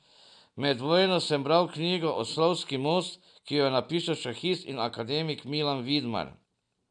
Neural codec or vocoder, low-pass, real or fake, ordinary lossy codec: none; 9.9 kHz; real; none